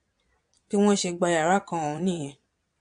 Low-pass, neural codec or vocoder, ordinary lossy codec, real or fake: 9.9 kHz; vocoder, 22.05 kHz, 80 mel bands, Vocos; AAC, 64 kbps; fake